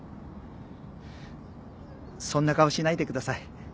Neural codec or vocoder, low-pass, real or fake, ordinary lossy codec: none; none; real; none